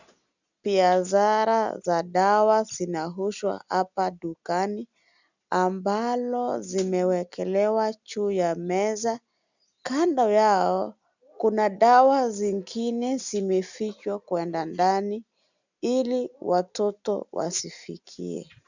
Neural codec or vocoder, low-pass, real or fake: none; 7.2 kHz; real